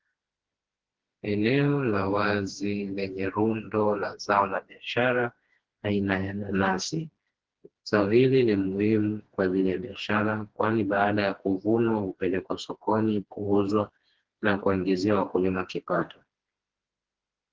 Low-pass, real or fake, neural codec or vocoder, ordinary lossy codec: 7.2 kHz; fake; codec, 16 kHz, 2 kbps, FreqCodec, smaller model; Opus, 16 kbps